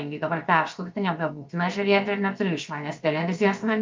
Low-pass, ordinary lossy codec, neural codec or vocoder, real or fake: 7.2 kHz; Opus, 24 kbps; codec, 16 kHz, 0.7 kbps, FocalCodec; fake